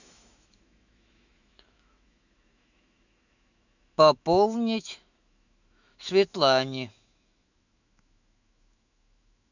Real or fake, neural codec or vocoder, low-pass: fake; autoencoder, 48 kHz, 128 numbers a frame, DAC-VAE, trained on Japanese speech; 7.2 kHz